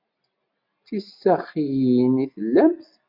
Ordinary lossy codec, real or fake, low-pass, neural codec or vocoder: MP3, 48 kbps; real; 5.4 kHz; none